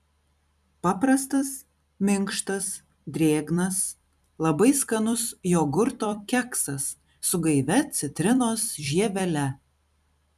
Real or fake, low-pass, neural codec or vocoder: fake; 14.4 kHz; vocoder, 44.1 kHz, 128 mel bands every 256 samples, BigVGAN v2